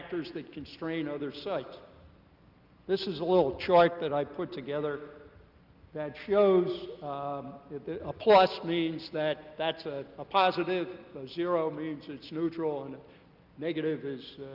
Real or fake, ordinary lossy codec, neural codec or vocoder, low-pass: real; Opus, 24 kbps; none; 5.4 kHz